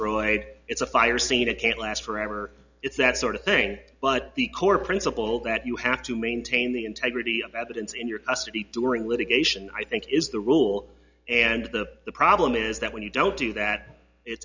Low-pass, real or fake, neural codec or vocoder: 7.2 kHz; real; none